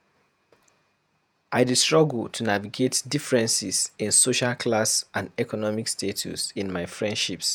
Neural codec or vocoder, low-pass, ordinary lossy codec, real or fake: vocoder, 48 kHz, 128 mel bands, Vocos; none; none; fake